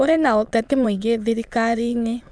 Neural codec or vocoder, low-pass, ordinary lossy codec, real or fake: autoencoder, 22.05 kHz, a latent of 192 numbers a frame, VITS, trained on many speakers; none; none; fake